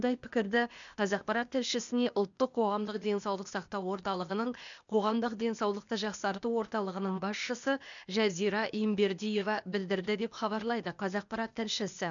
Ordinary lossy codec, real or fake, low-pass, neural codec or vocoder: none; fake; 7.2 kHz; codec, 16 kHz, 0.8 kbps, ZipCodec